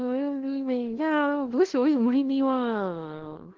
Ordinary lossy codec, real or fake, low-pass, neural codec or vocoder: Opus, 16 kbps; fake; 7.2 kHz; codec, 16 kHz, 1 kbps, FunCodec, trained on LibriTTS, 50 frames a second